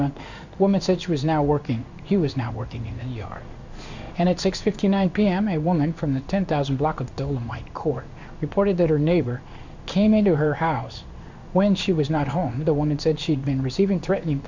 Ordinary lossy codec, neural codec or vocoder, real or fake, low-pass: Opus, 64 kbps; codec, 16 kHz in and 24 kHz out, 1 kbps, XY-Tokenizer; fake; 7.2 kHz